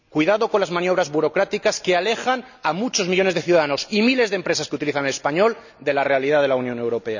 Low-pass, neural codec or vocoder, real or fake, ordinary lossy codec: 7.2 kHz; none; real; none